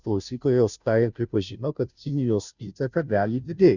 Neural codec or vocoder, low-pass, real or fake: codec, 16 kHz, 0.5 kbps, FunCodec, trained on Chinese and English, 25 frames a second; 7.2 kHz; fake